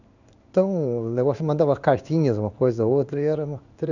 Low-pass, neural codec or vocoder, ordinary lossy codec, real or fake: 7.2 kHz; codec, 16 kHz in and 24 kHz out, 1 kbps, XY-Tokenizer; none; fake